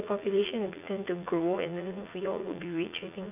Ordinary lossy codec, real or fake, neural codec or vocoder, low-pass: none; fake; vocoder, 44.1 kHz, 80 mel bands, Vocos; 3.6 kHz